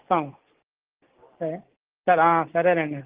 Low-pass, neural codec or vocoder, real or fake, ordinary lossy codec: 3.6 kHz; none; real; Opus, 16 kbps